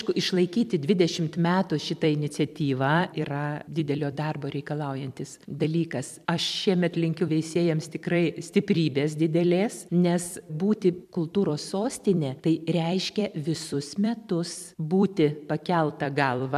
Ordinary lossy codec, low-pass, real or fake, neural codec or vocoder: MP3, 96 kbps; 14.4 kHz; fake; vocoder, 44.1 kHz, 128 mel bands every 256 samples, BigVGAN v2